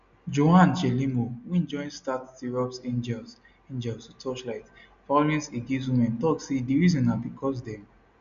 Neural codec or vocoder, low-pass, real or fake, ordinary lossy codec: none; 7.2 kHz; real; none